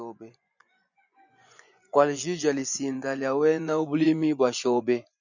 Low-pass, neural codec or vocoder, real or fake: 7.2 kHz; vocoder, 24 kHz, 100 mel bands, Vocos; fake